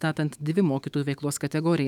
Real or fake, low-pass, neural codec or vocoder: fake; 19.8 kHz; autoencoder, 48 kHz, 128 numbers a frame, DAC-VAE, trained on Japanese speech